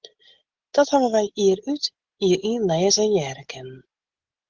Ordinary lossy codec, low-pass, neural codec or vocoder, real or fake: Opus, 16 kbps; 7.2 kHz; none; real